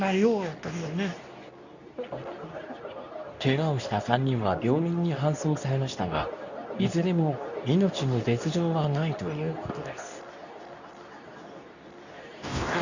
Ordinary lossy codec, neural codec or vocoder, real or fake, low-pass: none; codec, 24 kHz, 0.9 kbps, WavTokenizer, medium speech release version 2; fake; 7.2 kHz